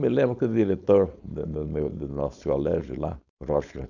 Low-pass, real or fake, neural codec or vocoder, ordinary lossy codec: 7.2 kHz; fake; codec, 16 kHz, 4.8 kbps, FACodec; none